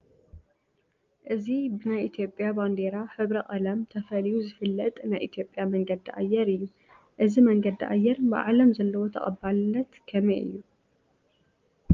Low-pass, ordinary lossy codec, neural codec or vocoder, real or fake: 7.2 kHz; Opus, 24 kbps; none; real